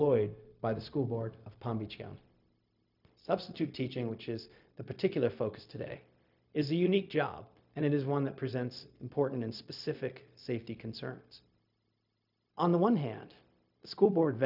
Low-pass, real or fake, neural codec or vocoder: 5.4 kHz; fake; codec, 16 kHz, 0.4 kbps, LongCat-Audio-Codec